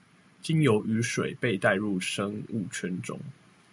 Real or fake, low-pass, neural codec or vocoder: real; 10.8 kHz; none